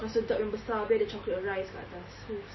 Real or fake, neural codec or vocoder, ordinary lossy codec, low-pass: real; none; MP3, 24 kbps; 7.2 kHz